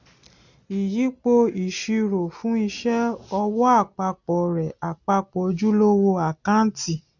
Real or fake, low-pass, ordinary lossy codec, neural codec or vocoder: real; 7.2 kHz; none; none